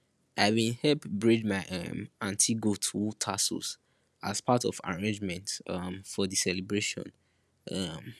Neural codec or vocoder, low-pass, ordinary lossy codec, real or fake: none; none; none; real